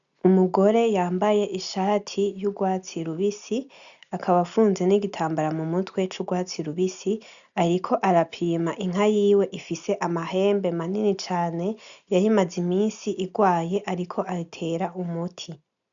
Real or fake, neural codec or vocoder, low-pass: real; none; 7.2 kHz